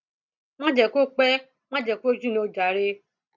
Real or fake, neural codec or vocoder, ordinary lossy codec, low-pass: real; none; none; 7.2 kHz